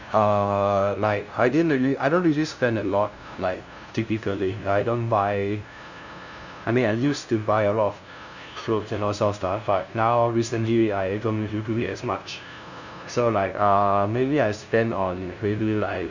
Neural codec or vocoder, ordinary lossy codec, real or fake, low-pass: codec, 16 kHz, 0.5 kbps, FunCodec, trained on LibriTTS, 25 frames a second; none; fake; 7.2 kHz